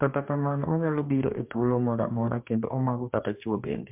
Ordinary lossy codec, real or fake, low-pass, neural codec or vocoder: MP3, 32 kbps; fake; 3.6 kHz; codec, 44.1 kHz, 2.6 kbps, DAC